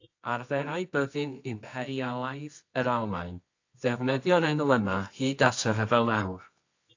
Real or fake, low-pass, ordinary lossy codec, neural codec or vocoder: fake; 7.2 kHz; AAC, 48 kbps; codec, 24 kHz, 0.9 kbps, WavTokenizer, medium music audio release